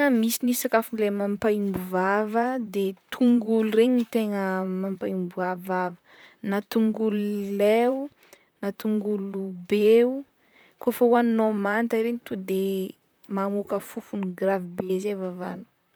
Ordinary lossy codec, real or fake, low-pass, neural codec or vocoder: none; fake; none; vocoder, 44.1 kHz, 128 mel bands, Pupu-Vocoder